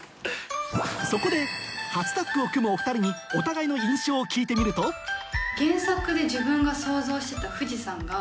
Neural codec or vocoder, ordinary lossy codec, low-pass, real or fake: none; none; none; real